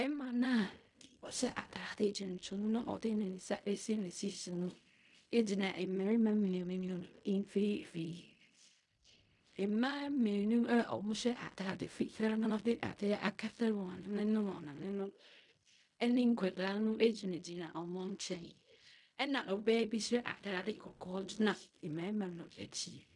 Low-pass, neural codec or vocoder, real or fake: 10.8 kHz; codec, 16 kHz in and 24 kHz out, 0.4 kbps, LongCat-Audio-Codec, fine tuned four codebook decoder; fake